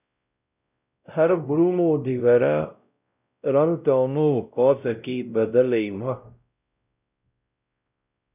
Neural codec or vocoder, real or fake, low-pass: codec, 16 kHz, 0.5 kbps, X-Codec, WavLM features, trained on Multilingual LibriSpeech; fake; 3.6 kHz